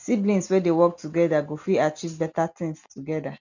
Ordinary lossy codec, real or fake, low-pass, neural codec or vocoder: none; real; 7.2 kHz; none